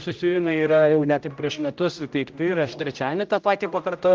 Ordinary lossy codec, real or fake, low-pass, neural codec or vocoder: Opus, 24 kbps; fake; 7.2 kHz; codec, 16 kHz, 0.5 kbps, X-Codec, HuBERT features, trained on general audio